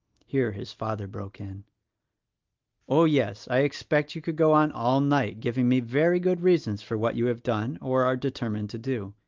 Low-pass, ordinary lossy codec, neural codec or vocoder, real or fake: 7.2 kHz; Opus, 32 kbps; none; real